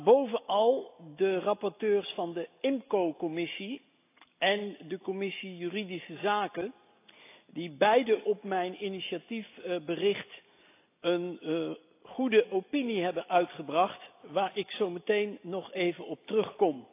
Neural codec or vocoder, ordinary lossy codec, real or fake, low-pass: none; AAC, 24 kbps; real; 3.6 kHz